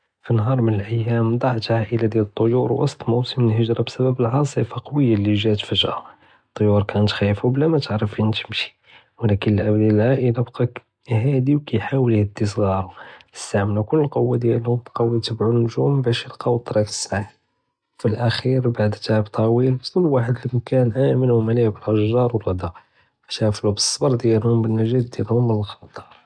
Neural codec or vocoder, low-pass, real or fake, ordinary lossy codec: none; 9.9 kHz; real; none